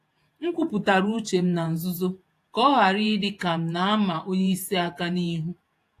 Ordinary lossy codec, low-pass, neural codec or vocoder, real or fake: AAC, 64 kbps; 14.4 kHz; vocoder, 48 kHz, 128 mel bands, Vocos; fake